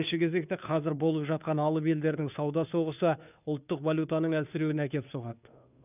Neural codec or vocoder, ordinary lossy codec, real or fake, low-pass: codec, 16 kHz, 2 kbps, FunCodec, trained on Chinese and English, 25 frames a second; none; fake; 3.6 kHz